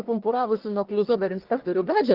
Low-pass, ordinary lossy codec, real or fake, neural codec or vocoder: 5.4 kHz; Opus, 16 kbps; fake; codec, 44.1 kHz, 1.7 kbps, Pupu-Codec